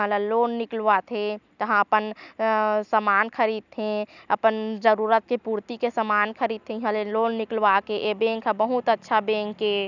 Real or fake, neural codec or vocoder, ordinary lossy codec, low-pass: real; none; none; 7.2 kHz